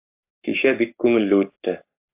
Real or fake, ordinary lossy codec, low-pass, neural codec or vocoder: fake; AAC, 32 kbps; 3.6 kHz; codec, 44.1 kHz, 7.8 kbps, Pupu-Codec